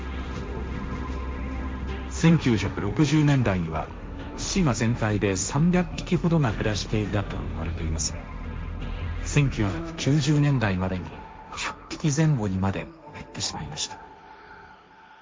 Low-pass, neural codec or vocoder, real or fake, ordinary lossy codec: none; codec, 16 kHz, 1.1 kbps, Voila-Tokenizer; fake; none